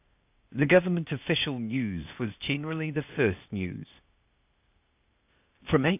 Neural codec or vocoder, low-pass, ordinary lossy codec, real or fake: codec, 16 kHz, 0.8 kbps, ZipCodec; 3.6 kHz; AAC, 32 kbps; fake